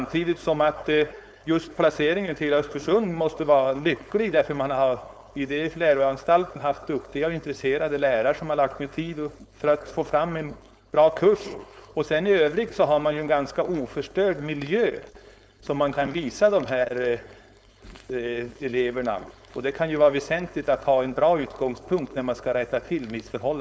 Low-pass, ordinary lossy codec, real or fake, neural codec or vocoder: none; none; fake; codec, 16 kHz, 4.8 kbps, FACodec